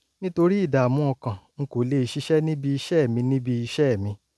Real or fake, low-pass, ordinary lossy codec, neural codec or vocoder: real; none; none; none